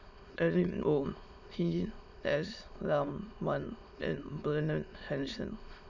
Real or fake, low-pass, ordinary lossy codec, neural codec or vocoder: fake; 7.2 kHz; none; autoencoder, 22.05 kHz, a latent of 192 numbers a frame, VITS, trained on many speakers